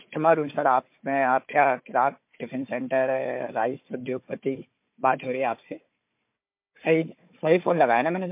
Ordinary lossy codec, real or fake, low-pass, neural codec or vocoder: MP3, 32 kbps; fake; 3.6 kHz; codec, 16 kHz, 4 kbps, FunCodec, trained on Chinese and English, 50 frames a second